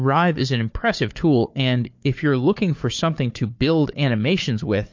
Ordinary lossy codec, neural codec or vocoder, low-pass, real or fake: MP3, 48 kbps; codec, 16 kHz, 4 kbps, FunCodec, trained on Chinese and English, 50 frames a second; 7.2 kHz; fake